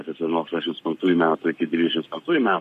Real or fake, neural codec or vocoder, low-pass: fake; codec, 44.1 kHz, 7.8 kbps, Pupu-Codec; 14.4 kHz